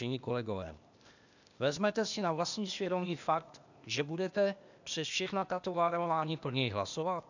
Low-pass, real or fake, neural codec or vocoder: 7.2 kHz; fake; codec, 16 kHz, 0.8 kbps, ZipCodec